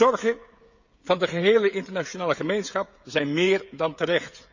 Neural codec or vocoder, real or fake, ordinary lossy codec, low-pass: codec, 16 kHz, 16 kbps, FreqCodec, smaller model; fake; Opus, 64 kbps; 7.2 kHz